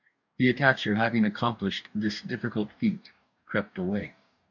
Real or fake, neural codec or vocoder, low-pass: fake; codec, 44.1 kHz, 2.6 kbps, DAC; 7.2 kHz